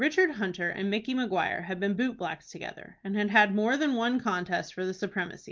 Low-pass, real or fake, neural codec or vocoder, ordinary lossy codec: 7.2 kHz; real; none; Opus, 32 kbps